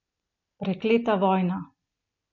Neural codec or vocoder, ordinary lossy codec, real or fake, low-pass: none; none; real; 7.2 kHz